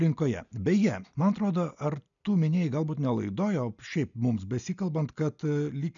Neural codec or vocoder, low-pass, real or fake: none; 7.2 kHz; real